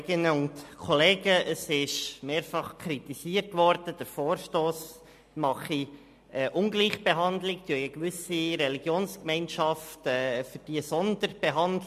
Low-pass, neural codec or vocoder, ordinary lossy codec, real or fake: 14.4 kHz; none; MP3, 64 kbps; real